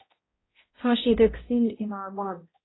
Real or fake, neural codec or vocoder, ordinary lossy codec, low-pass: fake; codec, 16 kHz, 0.5 kbps, X-Codec, HuBERT features, trained on balanced general audio; AAC, 16 kbps; 7.2 kHz